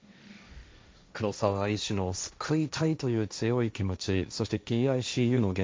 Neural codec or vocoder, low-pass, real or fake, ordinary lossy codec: codec, 16 kHz, 1.1 kbps, Voila-Tokenizer; none; fake; none